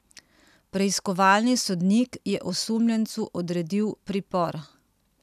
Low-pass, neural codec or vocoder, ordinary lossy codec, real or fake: 14.4 kHz; none; none; real